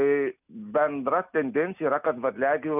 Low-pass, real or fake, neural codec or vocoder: 3.6 kHz; real; none